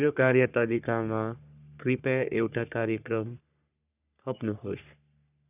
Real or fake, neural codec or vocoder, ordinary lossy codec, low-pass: fake; codec, 44.1 kHz, 3.4 kbps, Pupu-Codec; none; 3.6 kHz